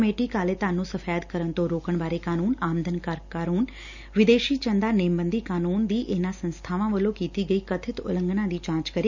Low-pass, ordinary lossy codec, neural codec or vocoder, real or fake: 7.2 kHz; none; none; real